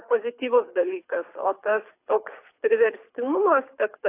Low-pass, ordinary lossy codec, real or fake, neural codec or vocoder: 3.6 kHz; AAC, 24 kbps; fake; codec, 24 kHz, 6 kbps, HILCodec